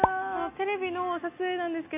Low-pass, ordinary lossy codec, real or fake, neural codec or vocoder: 3.6 kHz; none; real; none